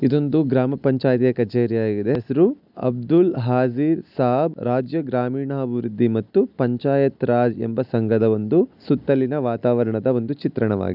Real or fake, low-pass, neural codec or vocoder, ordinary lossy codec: real; 5.4 kHz; none; none